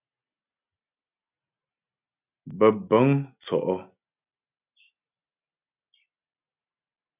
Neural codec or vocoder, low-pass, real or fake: none; 3.6 kHz; real